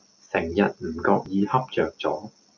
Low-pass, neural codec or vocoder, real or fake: 7.2 kHz; none; real